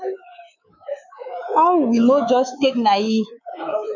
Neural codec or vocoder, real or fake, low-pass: codec, 24 kHz, 3.1 kbps, DualCodec; fake; 7.2 kHz